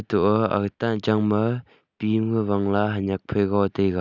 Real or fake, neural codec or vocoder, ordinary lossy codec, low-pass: real; none; none; 7.2 kHz